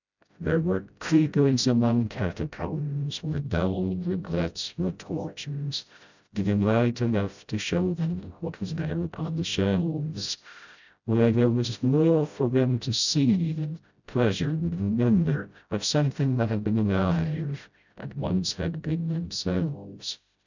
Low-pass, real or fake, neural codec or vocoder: 7.2 kHz; fake; codec, 16 kHz, 0.5 kbps, FreqCodec, smaller model